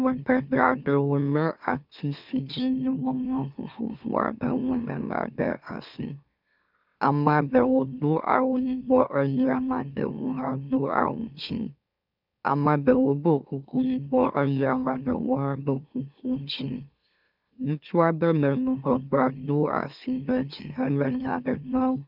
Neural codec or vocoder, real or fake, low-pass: autoencoder, 44.1 kHz, a latent of 192 numbers a frame, MeloTTS; fake; 5.4 kHz